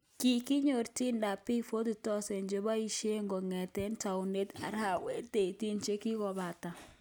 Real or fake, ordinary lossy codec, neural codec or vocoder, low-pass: real; none; none; none